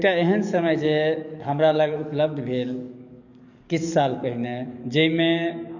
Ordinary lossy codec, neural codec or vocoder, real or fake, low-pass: none; codec, 44.1 kHz, 7.8 kbps, DAC; fake; 7.2 kHz